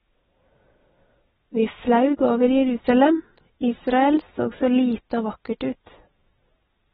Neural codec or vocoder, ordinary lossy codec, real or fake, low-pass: none; AAC, 16 kbps; real; 19.8 kHz